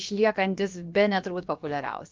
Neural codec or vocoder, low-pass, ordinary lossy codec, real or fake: codec, 16 kHz, about 1 kbps, DyCAST, with the encoder's durations; 7.2 kHz; Opus, 24 kbps; fake